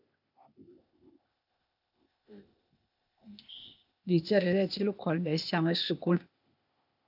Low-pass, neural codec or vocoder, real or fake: 5.4 kHz; codec, 16 kHz, 0.8 kbps, ZipCodec; fake